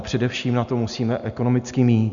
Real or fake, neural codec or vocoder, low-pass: real; none; 7.2 kHz